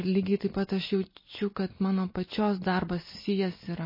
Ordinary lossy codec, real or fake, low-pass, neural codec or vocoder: MP3, 24 kbps; real; 5.4 kHz; none